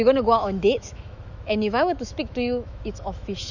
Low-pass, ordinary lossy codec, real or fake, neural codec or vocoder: 7.2 kHz; none; fake; autoencoder, 48 kHz, 128 numbers a frame, DAC-VAE, trained on Japanese speech